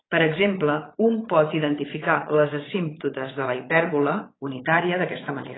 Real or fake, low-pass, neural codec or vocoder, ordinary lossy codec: fake; 7.2 kHz; vocoder, 22.05 kHz, 80 mel bands, Vocos; AAC, 16 kbps